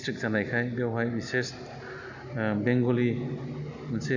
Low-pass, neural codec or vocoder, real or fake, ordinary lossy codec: 7.2 kHz; none; real; none